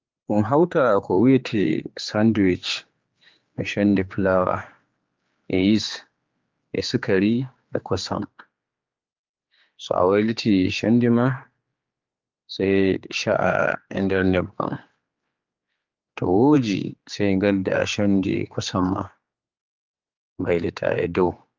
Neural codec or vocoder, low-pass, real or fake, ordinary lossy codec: codec, 16 kHz, 4 kbps, X-Codec, HuBERT features, trained on general audio; 7.2 kHz; fake; Opus, 32 kbps